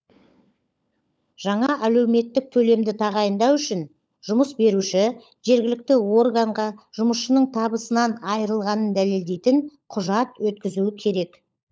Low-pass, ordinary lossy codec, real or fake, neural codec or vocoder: none; none; fake; codec, 16 kHz, 16 kbps, FunCodec, trained on LibriTTS, 50 frames a second